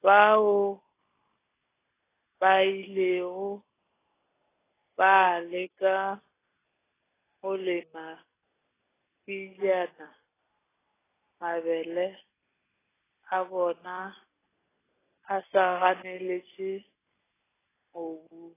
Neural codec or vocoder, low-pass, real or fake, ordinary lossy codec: none; 3.6 kHz; real; AAC, 16 kbps